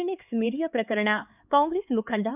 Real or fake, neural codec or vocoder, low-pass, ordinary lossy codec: fake; codec, 16 kHz, 4 kbps, X-Codec, HuBERT features, trained on LibriSpeech; 3.6 kHz; none